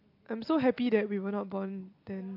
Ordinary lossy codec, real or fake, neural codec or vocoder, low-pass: AAC, 32 kbps; real; none; 5.4 kHz